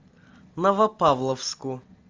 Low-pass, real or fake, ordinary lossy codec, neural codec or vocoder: 7.2 kHz; real; Opus, 32 kbps; none